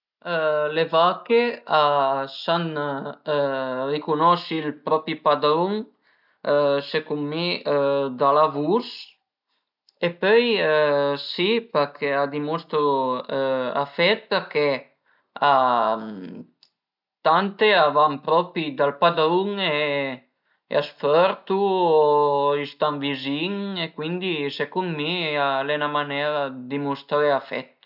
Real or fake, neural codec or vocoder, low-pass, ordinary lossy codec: real; none; 5.4 kHz; none